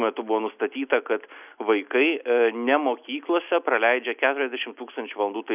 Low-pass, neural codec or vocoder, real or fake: 3.6 kHz; none; real